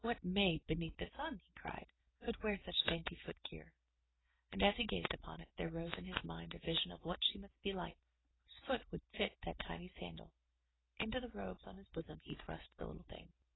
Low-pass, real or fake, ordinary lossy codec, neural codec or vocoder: 7.2 kHz; real; AAC, 16 kbps; none